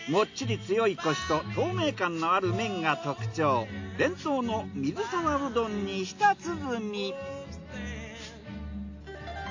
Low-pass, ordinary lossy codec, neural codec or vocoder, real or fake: 7.2 kHz; AAC, 48 kbps; none; real